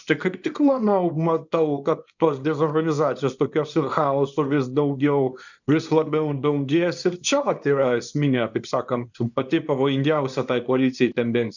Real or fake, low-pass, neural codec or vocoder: fake; 7.2 kHz; codec, 24 kHz, 0.9 kbps, WavTokenizer, small release